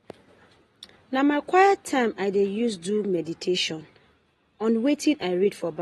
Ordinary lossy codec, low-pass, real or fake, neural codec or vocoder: AAC, 32 kbps; 19.8 kHz; real; none